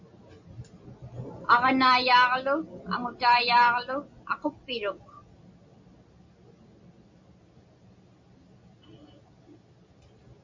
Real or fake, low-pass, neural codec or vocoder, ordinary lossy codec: real; 7.2 kHz; none; MP3, 64 kbps